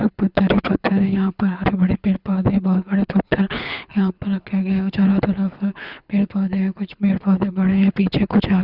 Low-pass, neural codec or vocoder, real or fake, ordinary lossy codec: 5.4 kHz; codec, 24 kHz, 6 kbps, HILCodec; fake; none